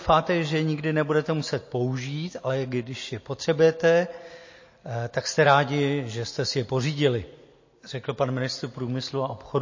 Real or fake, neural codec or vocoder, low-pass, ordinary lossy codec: real; none; 7.2 kHz; MP3, 32 kbps